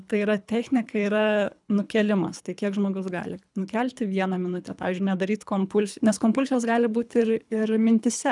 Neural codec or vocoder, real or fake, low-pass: codec, 24 kHz, 3 kbps, HILCodec; fake; 10.8 kHz